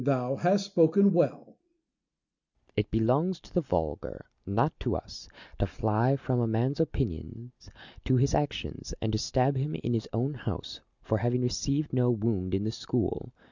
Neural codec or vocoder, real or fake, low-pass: none; real; 7.2 kHz